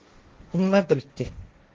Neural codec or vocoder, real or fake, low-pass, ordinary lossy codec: codec, 16 kHz, 1.1 kbps, Voila-Tokenizer; fake; 7.2 kHz; Opus, 16 kbps